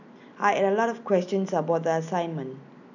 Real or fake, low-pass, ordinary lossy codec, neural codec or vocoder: real; 7.2 kHz; none; none